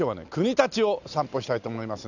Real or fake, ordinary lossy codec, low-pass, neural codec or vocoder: real; none; 7.2 kHz; none